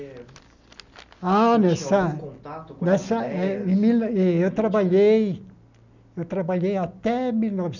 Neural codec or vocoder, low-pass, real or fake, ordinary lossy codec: none; 7.2 kHz; real; none